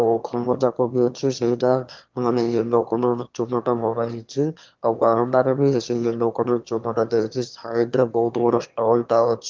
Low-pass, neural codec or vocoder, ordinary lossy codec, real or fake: 7.2 kHz; autoencoder, 22.05 kHz, a latent of 192 numbers a frame, VITS, trained on one speaker; Opus, 32 kbps; fake